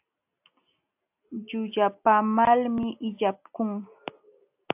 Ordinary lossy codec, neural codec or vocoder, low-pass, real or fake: AAC, 32 kbps; none; 3.6 kHz; real